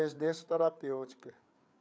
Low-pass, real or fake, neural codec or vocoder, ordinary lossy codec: none; fake; codec, 16 kHz, 8 kbps, FreqCodec, larger model; none